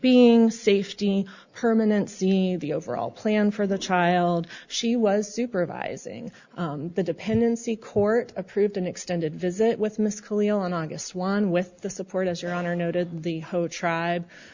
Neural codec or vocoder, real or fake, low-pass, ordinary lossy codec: none; real; 7.2 kHz; Opus, 64 kbps